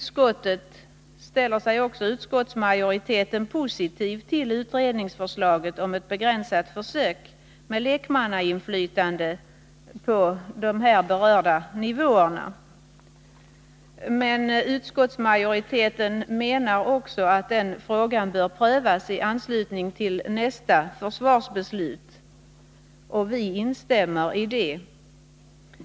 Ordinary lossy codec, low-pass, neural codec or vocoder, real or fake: none; none; none; real